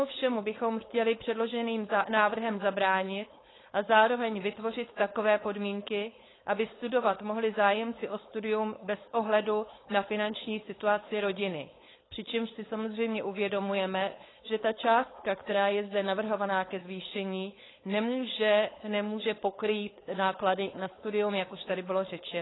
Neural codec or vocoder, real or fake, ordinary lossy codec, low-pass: codec, 16 kHz, 4.8 kbps, FACodec; fake; AAC, 16 kbps; 7.2 kHz